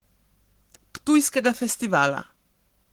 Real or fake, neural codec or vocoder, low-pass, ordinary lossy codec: fake; codec, 44.1 kHz, 7.8 kbps, Pupu-Codec; 19.8 kHz; Opus, 16 kbps